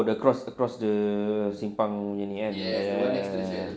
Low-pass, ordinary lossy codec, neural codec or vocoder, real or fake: none; none; none; real